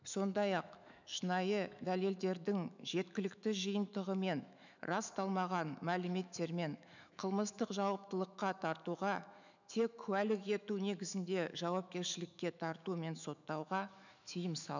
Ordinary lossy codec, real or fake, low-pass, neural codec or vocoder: none; fake; 7.2 kHz; autoencoder, 48 kHz, 128 numbers a frame, DAC-VAE, trained on Japanese speech